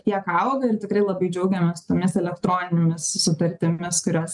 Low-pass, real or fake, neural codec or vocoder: 10.8 kHz; real; none